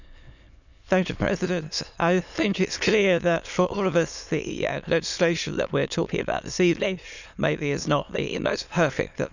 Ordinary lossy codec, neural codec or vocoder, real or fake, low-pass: none; autoencoder, 22.05 kHz, a latent of 192 numbers a frame, VITS, trained on many speakers; fake; 7.2 kHz